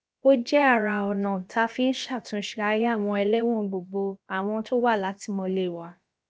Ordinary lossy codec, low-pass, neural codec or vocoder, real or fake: none; none; codec, 16 kHz, about 1 kbps, DyCAST, with the encoder's durations; fake